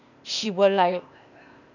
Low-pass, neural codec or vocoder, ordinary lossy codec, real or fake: 7.2 kHz; codec, 16 kHz, 0.8 kbps, ZipCodec; none; fake